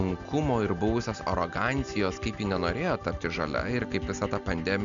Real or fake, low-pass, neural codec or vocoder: real; 7.2 kHz; none